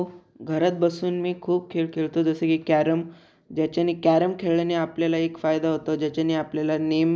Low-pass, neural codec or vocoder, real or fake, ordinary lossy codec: none; none; real; none